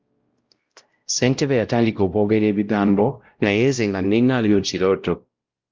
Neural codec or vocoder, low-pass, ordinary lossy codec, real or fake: codec, 16 kHz, 0.5 kbps, X-Codec, WavLM features, trained on Multilingual LibriSpeech; 7.2 kHz; Opus, 24 kbps; fake